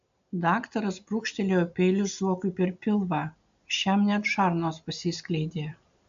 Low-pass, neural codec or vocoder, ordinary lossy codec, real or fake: 7.2 kHz; none; AAC, 64 kbps; real